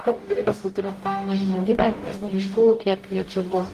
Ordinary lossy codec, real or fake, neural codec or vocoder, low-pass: Opus, 24 kbps; fake; codec, 44.1 kHz, 0.9 kbps, DAC; 14.4 kHz